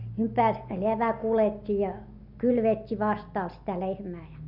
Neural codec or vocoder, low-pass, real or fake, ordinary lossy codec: none; 5.4 kHz; real; none